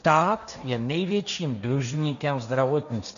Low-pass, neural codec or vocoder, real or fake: 7.2 kHz; codec, 16 kHz, 1.1 kbps, Voila-Tokenizer; fake